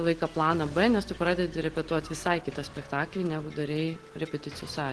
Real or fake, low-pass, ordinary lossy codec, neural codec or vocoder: real; 10.8 kHz; Opus, 16 kbps; none